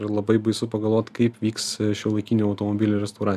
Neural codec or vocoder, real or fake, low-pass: none; real; 14.4 kHz